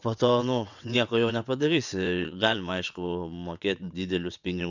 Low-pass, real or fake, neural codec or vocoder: 7.2 kHz; fake; vocoder, 22.05 kHz, 80 mel bands, WaveNeXt